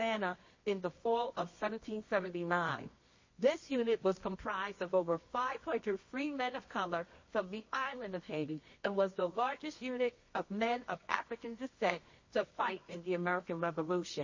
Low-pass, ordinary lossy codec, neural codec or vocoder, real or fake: 7.2 kHz; MP3, 32 kbps; codec, 24 kHz, 0.9 kbps, WavTokenizer, medium music audio release; fake